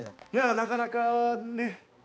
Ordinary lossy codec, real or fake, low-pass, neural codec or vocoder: none; fake; none; codec, 16 kHz, 2 kbps, X-Codec, HuBERT features, trained on balanced general audio